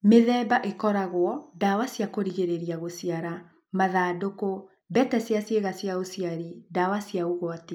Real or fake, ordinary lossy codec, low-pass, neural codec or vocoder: real; none; 19.8 kHz; none